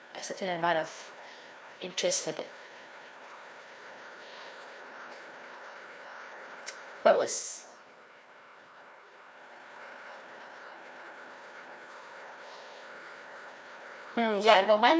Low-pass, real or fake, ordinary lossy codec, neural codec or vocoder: none; fake; none; codec, 16 kHz, 1 kbps, FreqCodec, larger model